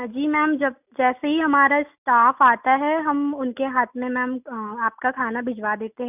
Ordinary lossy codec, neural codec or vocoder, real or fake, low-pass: none; none; real; 3.6 kHz